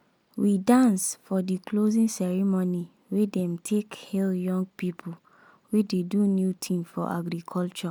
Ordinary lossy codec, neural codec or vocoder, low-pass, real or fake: none; none; none; real